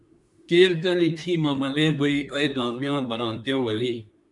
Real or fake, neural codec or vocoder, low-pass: fake; codec, 24 kHz, 1 kbps, SNAC; 10.8 kHz